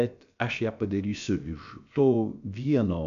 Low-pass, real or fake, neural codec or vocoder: 7.2 kHz; fake; codec, 16 kHz, about 1 kbps, DyCAST, with the encoder's durations